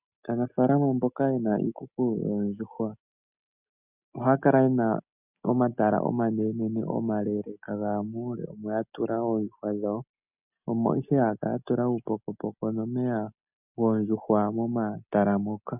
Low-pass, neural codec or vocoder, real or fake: 3.6 kHz; none; real